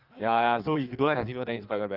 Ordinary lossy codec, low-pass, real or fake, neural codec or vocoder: Opus, 32 kbps; 5.4 kHz; fake; codec, 16 kHz in and 24 kHz out, 1.1 kbps, FireRedTTS-2 codec